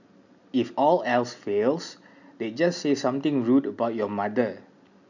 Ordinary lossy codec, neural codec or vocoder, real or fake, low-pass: none; none; real; 7.2 kHz